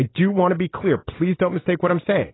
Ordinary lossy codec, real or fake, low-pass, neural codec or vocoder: AAC, 16 kbps; real; 7.2 kHz; none